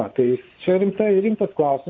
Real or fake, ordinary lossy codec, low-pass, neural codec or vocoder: fake; AAC, 32 kbps; 7.2 kHz; vocoder, 24 kHz, 100 mel bands, Vocos